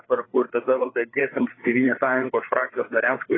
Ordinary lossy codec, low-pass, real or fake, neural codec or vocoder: AAC, 16 kbps; 7.2 kHz; fake; codec, 16 kHz, 4 kbps, FreqCodec, larger model